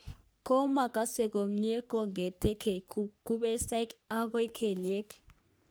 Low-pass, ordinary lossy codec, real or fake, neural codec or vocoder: none; none; fake; codec, 44.1 kHz, 3.4 kbps, Pupu-Codec